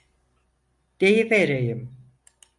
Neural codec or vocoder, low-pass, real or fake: none; 10.8 kHz; real